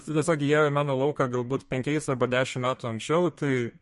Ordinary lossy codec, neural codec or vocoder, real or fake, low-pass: MP3, 48 kbps; codec, 32 kHz, 1.9 kbps, SNAC; fake; 14.4 kHz